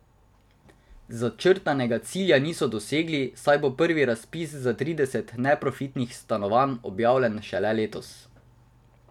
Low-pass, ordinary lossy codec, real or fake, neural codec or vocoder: 19.8 kHz; none; real; none